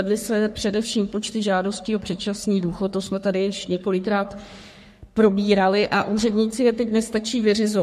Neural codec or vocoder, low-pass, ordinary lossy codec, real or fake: codec, 44.1 kHz, 3.4 kbps, Pupu-Codec; 14.4 kHz; MP3, 64 kbps; fake